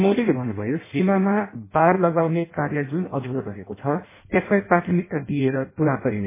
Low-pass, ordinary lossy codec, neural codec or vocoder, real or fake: 3.6 kHz; MP3, 16 kbps; codec, 16 kHz in and 24 kHz out, 0.6 kbps, FireRedTTS-2 codec; fake